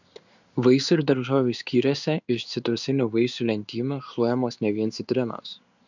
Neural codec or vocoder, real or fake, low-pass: codec, 16 kHz in and 24 kHz out, 1 kbps, XY-Tokenizer; fake; 7.2 kHz